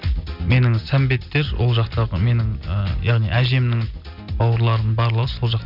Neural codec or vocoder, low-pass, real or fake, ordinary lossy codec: none; 5.4 kHz; real; none